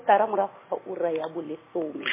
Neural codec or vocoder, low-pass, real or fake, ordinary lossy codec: none; 3.6 kHz; real; MP3, 16 kbps